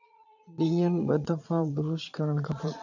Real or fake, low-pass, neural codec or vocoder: fake; 7.2 kHz; vocoder, 22.05 kHz, 80 mel bands, Vocos